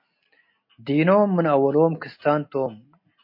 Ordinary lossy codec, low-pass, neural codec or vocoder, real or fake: MP3, 32 kbps; 5.4 kHz; none; real